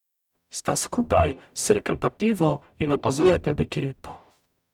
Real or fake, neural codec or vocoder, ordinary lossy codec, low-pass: fake; codec, 44.1 kHz, 0.9 kbps, DAC; none; 19.8 kHz